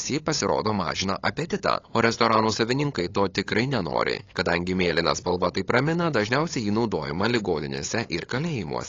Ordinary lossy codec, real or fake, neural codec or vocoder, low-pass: AAC, 32 kbps; fake; codec, 16 kHz, 8 kbps, FunCodec, trained on LibriTTS, 25 frames a second; 7.2 kHz